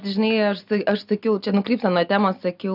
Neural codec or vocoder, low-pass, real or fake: none; 5.4 kHz; real